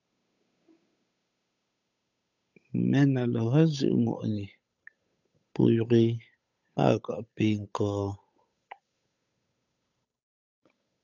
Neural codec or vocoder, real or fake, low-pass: codec, 16 kHz, 8 kbps, FunCodec, trained on Chinese and English, 25 frames a second; fake; 7.2 kHz